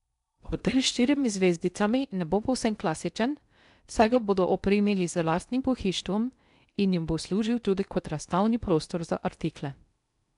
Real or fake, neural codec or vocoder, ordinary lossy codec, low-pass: fake; codec, 16 kHz in and 24 kHz out, 0.6 kbps, FocalCodec, streaming, 2048 codes; MP3, 96 kbps; 10.8 kHz